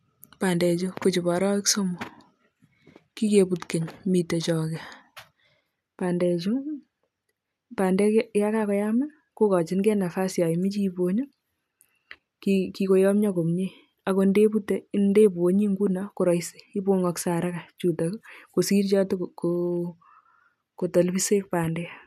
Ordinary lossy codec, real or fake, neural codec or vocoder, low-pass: MP3, 96 kbps; real; none; 14.4 kHz